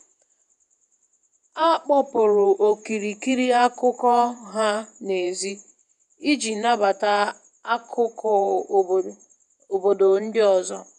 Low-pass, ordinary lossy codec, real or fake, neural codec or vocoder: 10.8 kHz; none; fake; vocoder, 48 kHz, 128 mel bands, Vocos